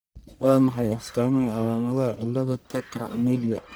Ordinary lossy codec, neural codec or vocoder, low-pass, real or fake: none; codec, 44.1 kHz, 1.7 kbps, Pupu-Codec; none; fake